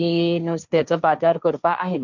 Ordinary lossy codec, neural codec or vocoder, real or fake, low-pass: none; codec, 16 kHz, 1.1 kbps, Voila-Tokenizer; fake; 7.2 kHz